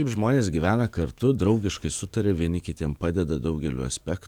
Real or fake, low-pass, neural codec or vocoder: fake; 19.8 kHz; codec, 44.1 kHz, 7.8 kbps, DAC